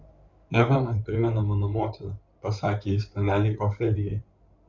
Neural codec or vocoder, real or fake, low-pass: codec, 16 kHz in and 24 kHz out, 2.2 kbps, FireRedTTS-2 codec; fake; 7.2 kHz